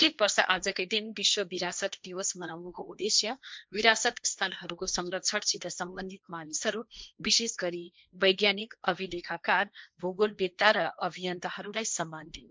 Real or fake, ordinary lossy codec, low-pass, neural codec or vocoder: fake; none; none; codec, 16 kHz, 1.1 kbps, Voila-Tokenizer